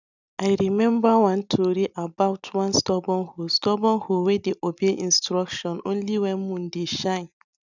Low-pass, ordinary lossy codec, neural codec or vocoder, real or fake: 7.2 kHz; none; none; real